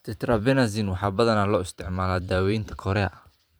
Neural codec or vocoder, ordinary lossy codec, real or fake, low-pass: vocoder, 44.1 kHz, 128 mel bands every 512 samples, BigVGAN v2; none; fake; none